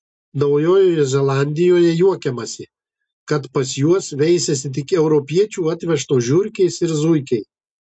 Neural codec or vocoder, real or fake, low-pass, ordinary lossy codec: none; real; 9.9 kHz; MP3, 64 kbps